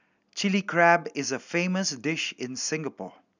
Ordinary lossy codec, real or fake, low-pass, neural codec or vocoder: none; real; 7.2 kHz; none